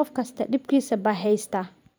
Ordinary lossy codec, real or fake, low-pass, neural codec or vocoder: none; fake; none; vocoder, 44.1 kHz, 128 mel bands every 512 samples, BigVGAN v2